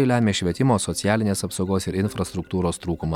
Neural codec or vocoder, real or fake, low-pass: none; real; 19.8 kHz